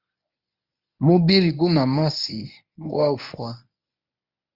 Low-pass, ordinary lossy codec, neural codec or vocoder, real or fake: 5.4 kHz; Opus, 64 kbps; codec, 24 kHz, 0.9 kbps, WavTokenizer, medium speech release version 1; fake